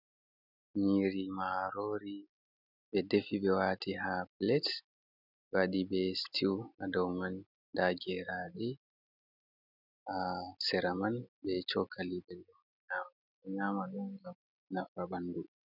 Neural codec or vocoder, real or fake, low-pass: none; real; 5.4 kHz